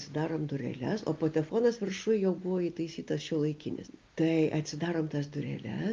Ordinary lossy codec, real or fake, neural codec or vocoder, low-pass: Opus, 32 kbps; real; none; 7.2 kHz